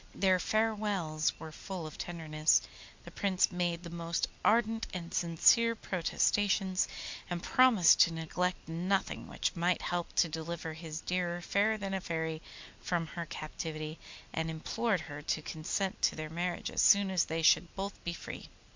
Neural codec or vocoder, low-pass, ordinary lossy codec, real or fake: none; 7.2 kHz; MP3, 64 kbps; real